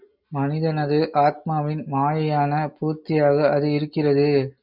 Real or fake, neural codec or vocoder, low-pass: real; none; 5.4 kHz